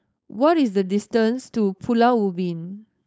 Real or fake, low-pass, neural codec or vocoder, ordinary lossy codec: fake; none; codec, 16 kHz, 4.8 kbps, FACodec; none